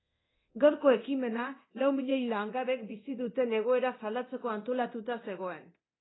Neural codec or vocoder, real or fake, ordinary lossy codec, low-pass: codec, 24 kHz, 0.9 kbps, DualCodec; fake; AAC, 16 kbps; 7.2 kHz